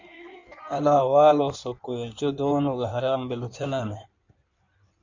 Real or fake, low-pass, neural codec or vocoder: fake; 7.2 kHz; codec, 16 kHz in and 24 kHz out, 1.1 kbps, FireRedTTS-2 codec